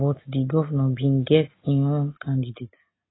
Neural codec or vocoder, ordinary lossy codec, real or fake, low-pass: none; AAC, 16 kbps; real; 7.2 kHz